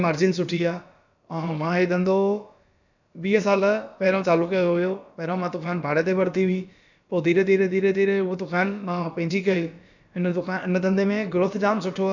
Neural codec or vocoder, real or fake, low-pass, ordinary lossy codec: codec, 16 kHz, about 1 kbps, DyCAST, with the encoder's durations; fake; 7.2 kHz; none